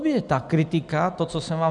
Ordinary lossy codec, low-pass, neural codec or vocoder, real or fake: MP3, 96 kbps; 10.8 kHz; none; real